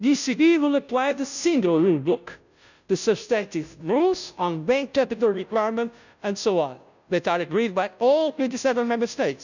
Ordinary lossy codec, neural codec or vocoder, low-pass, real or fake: none; codec, 16 kHz, 0.5 kbps, FunCodec, trained on Chinese and English, 25 frames a second; 7.2 kHz; fake